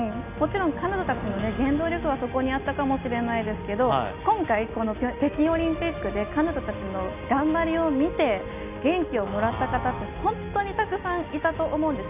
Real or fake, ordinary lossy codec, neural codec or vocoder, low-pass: real; none; none; 3.6 kHz